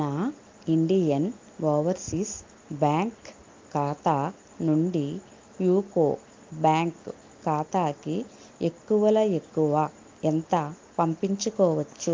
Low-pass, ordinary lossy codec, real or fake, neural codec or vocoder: 7.2 kHz; Opus, 32 kbps; real; none